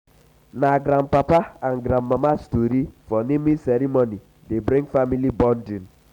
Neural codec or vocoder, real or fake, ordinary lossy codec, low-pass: none; real; none; 19.8 kHz